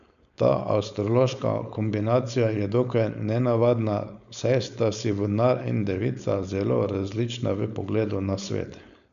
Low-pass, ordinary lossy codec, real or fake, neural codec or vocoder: 7.2 kHz; none; fake; codec, 16 kHz, 4.8 kbps, FACodec